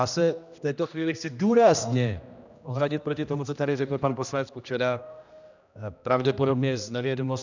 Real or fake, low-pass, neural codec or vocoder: fake; 7.2 kHz; codec, 16 kHz, 1 kbps, X-Codec, HuBERT features, trained on general audio